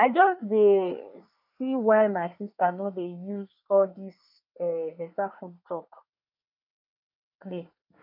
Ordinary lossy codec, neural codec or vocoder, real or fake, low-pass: none; codec, 24 kHz, 1 kbps, SNAC; fake; 5.4 kHz